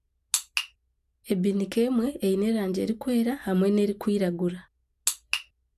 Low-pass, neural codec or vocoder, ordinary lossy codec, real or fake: 14.4 kHz; none; AAC, 96 kbps; real